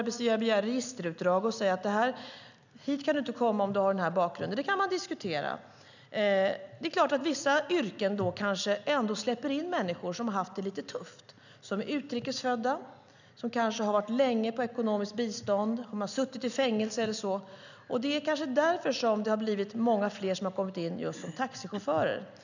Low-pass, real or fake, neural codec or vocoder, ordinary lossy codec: 7.2 kHz; real; none; none